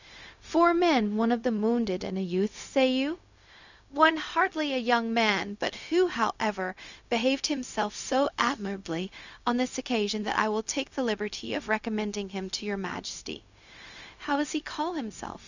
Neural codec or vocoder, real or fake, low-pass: codec, 16 kHz, 0.4 kbps, LongCat-Audio-Codec; fake; 7.2 kHz